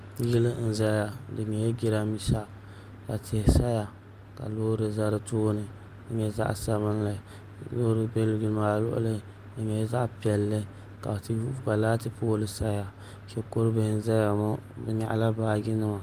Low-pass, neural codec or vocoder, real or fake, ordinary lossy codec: 14.4 kHz; none; real; Opus, 32 kbps